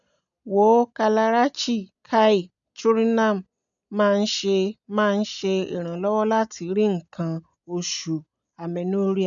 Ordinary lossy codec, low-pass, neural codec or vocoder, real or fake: none; 7.2 kHz; none; real